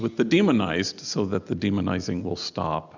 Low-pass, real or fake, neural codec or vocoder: 7.2 kHz; real; none